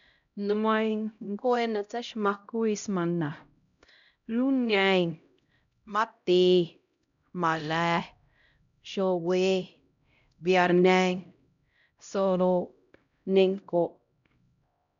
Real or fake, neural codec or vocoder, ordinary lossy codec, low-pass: fake; codec, 16 kHz, 0.5 kbps, X-Codec, HuBERT features, trained on LibriSpeech; none; 7.2 kHz